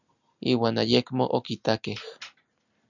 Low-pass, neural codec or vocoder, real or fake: 7.2 kHz; none; real